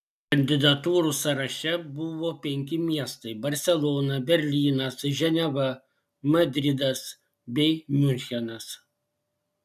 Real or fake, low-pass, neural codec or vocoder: real; 14.4 kHz; none